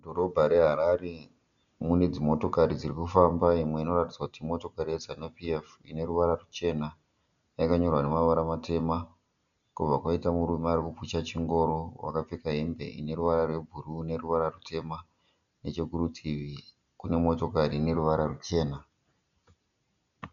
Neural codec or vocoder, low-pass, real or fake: none; 7.2 kHz; real